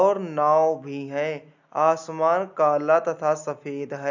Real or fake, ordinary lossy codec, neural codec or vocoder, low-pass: real; none; none; 7.2 kHz